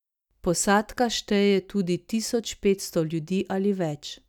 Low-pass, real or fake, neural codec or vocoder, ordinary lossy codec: 19.8 kHz; real; none; none